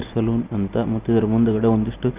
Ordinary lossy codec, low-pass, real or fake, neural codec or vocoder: Opus, 24 kbps; 3.6 kHz; real; none